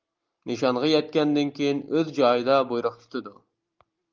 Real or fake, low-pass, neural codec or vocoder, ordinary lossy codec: real; 7.2 kHz; none; Opus, 32 kbps